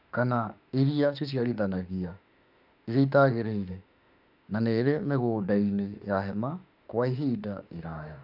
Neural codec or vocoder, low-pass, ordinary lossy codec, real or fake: autoencoder, 48 kHz, 32 numbers a frame, DAC-VAE, trained on Japanese speech; 5.4 kHz; none; fake